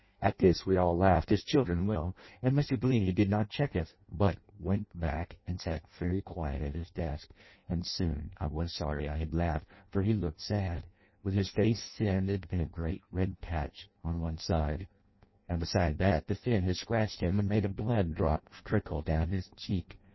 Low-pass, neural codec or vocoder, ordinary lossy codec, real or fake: 7.2 kHz; codec, 16 kHz in and 24 kHz out, 0.6 kbps, FireRedTTS-2 codec; MP3, 24 kbps; fake